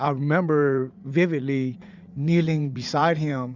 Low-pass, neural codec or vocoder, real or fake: 7.2 kHz; vocoder, 44.1 kHz, 80 mel bands, Vocos; fake